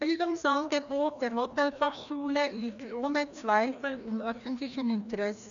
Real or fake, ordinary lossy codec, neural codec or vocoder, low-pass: fake; none; codec, 16 kHz, 1 kbps, FreqCodec, larger model; 7.2 kHz